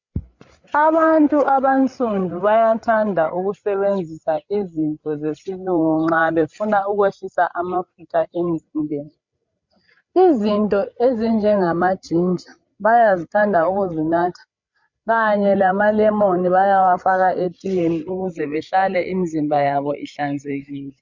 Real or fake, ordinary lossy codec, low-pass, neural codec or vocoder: fake; MP3, 64 kbps; 7.2 kHz; codec, 16 kHz, 8 kbps, FreqCodec, larger model